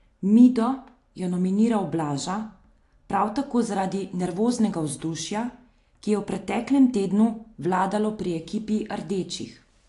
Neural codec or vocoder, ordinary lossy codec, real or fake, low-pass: none; AAC, 48 kbps; real; 10.8 kHz